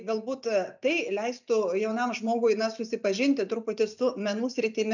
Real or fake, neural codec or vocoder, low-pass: real; none; 7.2 kHz